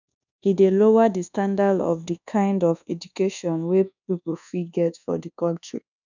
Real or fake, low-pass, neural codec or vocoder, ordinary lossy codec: fake; 7.2 kHz; codec, 24 kHz, 1.2 kbps, DualCodec; none